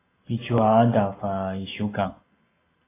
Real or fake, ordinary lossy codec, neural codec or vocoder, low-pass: real; AAC, 16 kbps; none; 3.6 kHz